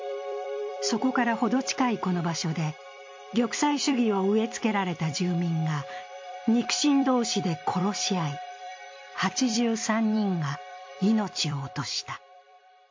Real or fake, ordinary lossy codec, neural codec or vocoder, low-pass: fake; MP3, 48 kbps; vocoder, 44.1 kHz, 128 mel bands every 256 samples, BigVGAN v2; 7.2 kHz